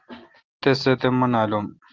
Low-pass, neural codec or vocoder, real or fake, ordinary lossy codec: 7.2 kHz; none; real; Opus, 16 kbps